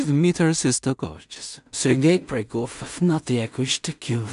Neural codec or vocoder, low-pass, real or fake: codec, 16 kHz in and 24 kHz out, 0.4 kbps, LongCat-Audio-Codec, two codebook decoder; 10.8 kHz; fake